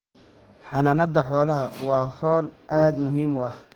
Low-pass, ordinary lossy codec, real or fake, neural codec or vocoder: 19.8 kHz; Opus, 24 kbps; fake; codec, 44.1 kHz, 2.6 kbps, DAC